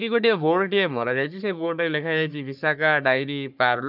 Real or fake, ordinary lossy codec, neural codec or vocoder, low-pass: fake; none; codec, 44.1 kHz, 3.4 kbps, Pupu-Codec; 5.4 kHz